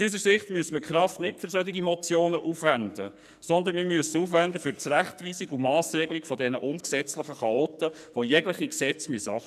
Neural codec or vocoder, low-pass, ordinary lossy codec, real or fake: codec, 44.1 kHz, 2.6 kbps, SNAC; 14.4 kHz; none; fake